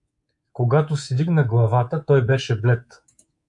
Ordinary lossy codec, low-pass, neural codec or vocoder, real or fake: MP3, 64 kbps; 10.8 kHz; codec, 24 kHz, 3.1 kbps, DualCodec; fake